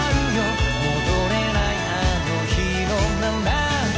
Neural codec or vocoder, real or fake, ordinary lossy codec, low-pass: none; real; none; none